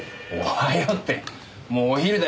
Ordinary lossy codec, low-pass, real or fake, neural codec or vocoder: none; none; real; none